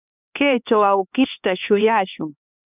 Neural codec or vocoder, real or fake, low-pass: codec, 16 kHz, 2 kbps, X-Codec, HuBERT features, trained on LibriSpeech; fake; 3.6 kHz